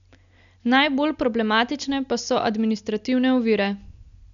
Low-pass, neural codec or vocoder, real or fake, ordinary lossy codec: 7.2 kHz; none; real; none